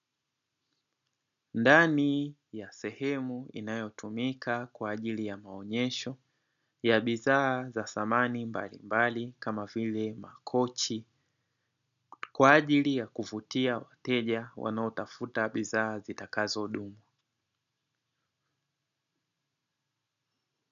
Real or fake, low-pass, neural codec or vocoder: real; 7.2 kHz; none